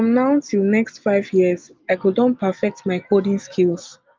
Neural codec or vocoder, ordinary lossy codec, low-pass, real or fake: none; Opus, 32 kbps; 7.2 kHz; real